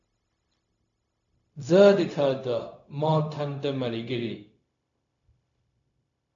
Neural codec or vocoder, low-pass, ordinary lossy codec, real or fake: codec, 16 kHz, 0.4 kbps, LongCat-Audio-Codec; 7.2 kHz; AAC, 48 kbps; fake